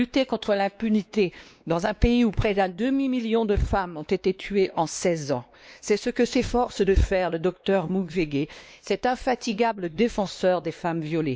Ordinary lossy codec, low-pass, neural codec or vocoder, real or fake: none; none; codec, 16 kHz, 2 kbps, X-Codec, WavLM features, trained on Multilingual LibriSpeech; fake